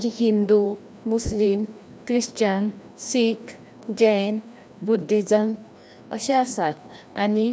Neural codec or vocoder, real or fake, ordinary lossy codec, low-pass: codec, 16 kHz, 1 kbps, FreqCodec, larger model; fake; none; none